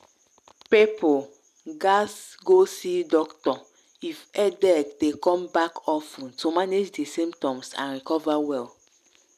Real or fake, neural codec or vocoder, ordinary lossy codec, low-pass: real; none; none; 14.4 kHz